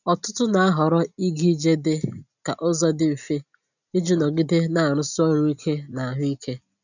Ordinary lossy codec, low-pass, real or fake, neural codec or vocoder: none; 7.2 kHz; real; none